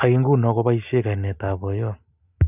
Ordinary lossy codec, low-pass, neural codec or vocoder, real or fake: none; 3.6 kHz; none; real